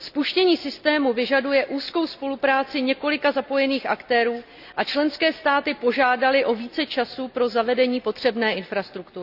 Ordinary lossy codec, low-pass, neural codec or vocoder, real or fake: none; 5.4 kHz; none; real